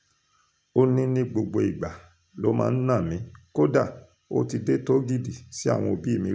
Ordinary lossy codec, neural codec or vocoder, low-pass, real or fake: none; none; none; real